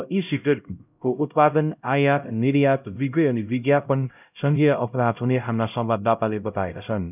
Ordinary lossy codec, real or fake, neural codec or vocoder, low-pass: none; fake; codec, 16 kHz, 0.5 kbps, X-Codec, HuBERT features, trained on LibriSpeech; 3.6 kHz